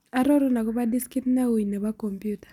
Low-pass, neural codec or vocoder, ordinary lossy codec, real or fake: 19.8 kHz; none; none; real